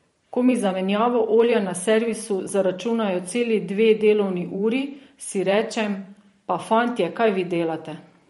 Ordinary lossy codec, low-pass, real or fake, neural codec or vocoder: MP3, 48 kbps; 19.8 kHz; fake; vocoder, 44.1 kHz, 128 mel bands every 512 samples, BigVGAN v2